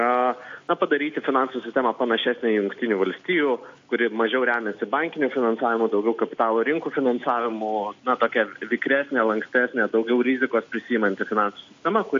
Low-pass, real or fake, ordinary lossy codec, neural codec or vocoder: 7.2 kHz; real; AAC, 48 kbps; none